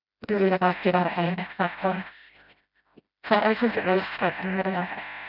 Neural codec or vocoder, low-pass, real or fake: codec, 16 kHz, 0.5 kbps, FreqCodec, smaller model; 5.4 kHz; fake